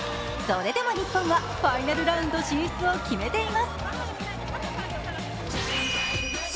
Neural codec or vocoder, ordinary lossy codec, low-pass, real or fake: none; none; none; real